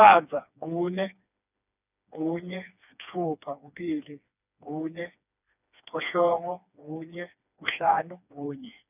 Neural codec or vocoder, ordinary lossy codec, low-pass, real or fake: codec, 16 kHz, 2 kbps, FreqCodec, smaller model; none; 3.6 kHz; fake